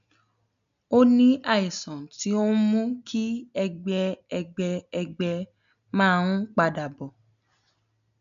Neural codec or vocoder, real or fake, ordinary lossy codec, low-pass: none; real; none; 7.2 kHz